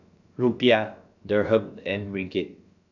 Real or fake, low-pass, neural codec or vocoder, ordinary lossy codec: fake; 7.2 kHz; codec, 16 kHz, about 1 kbps, DyCAST, with the encoder's durations; none